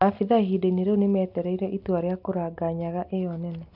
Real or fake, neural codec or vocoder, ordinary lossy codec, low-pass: real; none; none; 5.4 kHz